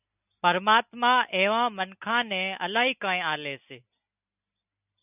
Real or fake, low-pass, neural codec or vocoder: real; 3.6 kHz; none